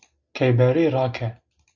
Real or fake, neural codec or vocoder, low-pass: real; none; 7.2 kHz